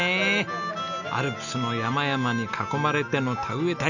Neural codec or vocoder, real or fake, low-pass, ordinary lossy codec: none; real; 7.2 kHz; none